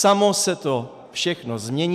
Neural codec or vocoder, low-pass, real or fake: none; 14.4 kHz; real